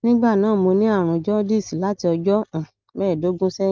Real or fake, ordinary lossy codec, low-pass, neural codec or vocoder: real; Opus, 24 kbps; 7.2 kHz; none